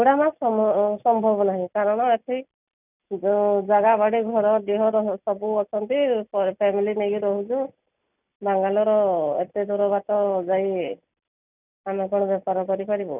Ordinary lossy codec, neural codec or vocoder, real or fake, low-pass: none; none; real; 3.6 kHz